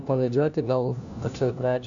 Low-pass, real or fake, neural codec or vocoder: 7.2 kHz; fake; codec, 16 kHz, 1 kbps, FunCodec, trained on LibriTTS, 50 frames a second